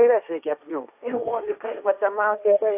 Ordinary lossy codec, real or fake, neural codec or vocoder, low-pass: AAC, 32 kbps; fake; codec, 16 kHz, 1.1 kbps, Voila-Tokenizer; 3.6 kHz